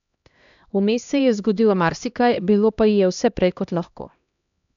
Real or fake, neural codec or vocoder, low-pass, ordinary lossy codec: fake; codec, 16 kHz, 1 kbps, X-Codec, HuBERT features, trained on LibriSpeech; 7.2 kHz; none